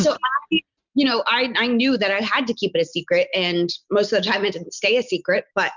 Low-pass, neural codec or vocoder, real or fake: 7.2 kHz; none; real